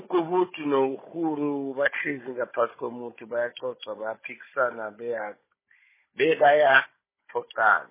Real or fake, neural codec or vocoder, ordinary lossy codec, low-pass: fake; codec, 16 kHz, 16 kbps, FunCodec, trained on Chinese and English, 50 frames a second; MP3, 16 kbps; 3.6 kHz